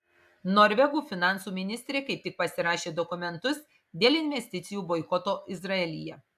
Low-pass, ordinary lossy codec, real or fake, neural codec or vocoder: 14.4 kHz; AAC, 96 kbps; real; none